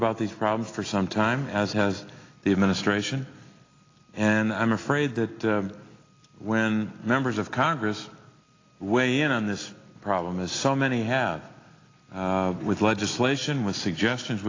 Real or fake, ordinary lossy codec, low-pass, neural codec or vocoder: real; AAC, 32 kbps; 7.2 kHz; none